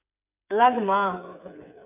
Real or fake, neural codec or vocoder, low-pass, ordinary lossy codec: fake; codec, 16 kHz, 8 kbps, FreqCodec, smaller model; 3.6 kHz; none